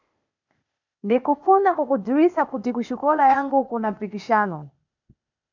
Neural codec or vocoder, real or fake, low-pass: codec, 16 kHz, 0.8 kbps, ZipCodec; fake; 7.2 kHz